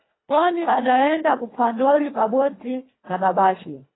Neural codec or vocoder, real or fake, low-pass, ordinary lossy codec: codec, 24 kHz, 1.5 kbps, HILCodec; fake; 7.2 kHz; AAC, 16 kbps